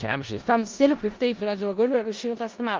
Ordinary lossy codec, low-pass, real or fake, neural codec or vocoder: Opus, 32 kbps; 7.2 kHz; fake; codec, 16 kHz in and 24 kHz out, 0.4 kbps, LongCat-Audio-Codec, four codebook decoder